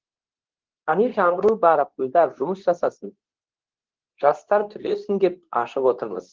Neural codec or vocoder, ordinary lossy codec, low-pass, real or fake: codec, 24 kHz, 0.9 kbps, WavTokenizer, medium speech release version 2; Opus, 16 kbps; 7.2 kHz; fake